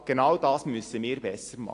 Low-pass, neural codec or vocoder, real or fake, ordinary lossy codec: 10.8 kHz; none; real; AAC, 48 kbps